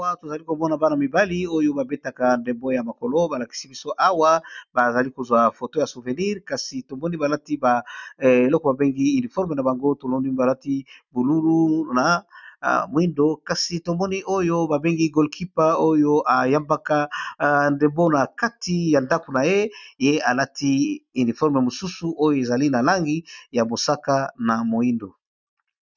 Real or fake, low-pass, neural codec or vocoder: real; 7.2 kHz; none